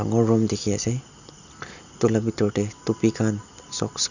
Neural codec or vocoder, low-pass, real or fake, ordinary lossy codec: none; 7.2 kHz; real; none